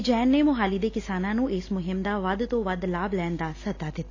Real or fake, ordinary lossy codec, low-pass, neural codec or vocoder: real; AAC, 32 kbps; 7.2 kHz; none